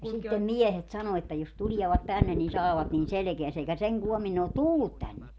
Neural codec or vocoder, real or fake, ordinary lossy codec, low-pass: none; real; none; none